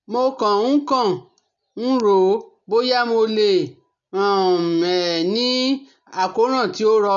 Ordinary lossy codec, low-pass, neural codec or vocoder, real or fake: none; 7.2 kHz; none; real